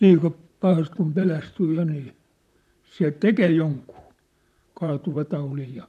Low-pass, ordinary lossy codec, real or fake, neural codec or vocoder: 14.4 kHz; none; fake; vocoder, 44.1 kHz, 128 mel bands, Pupu-Vocoder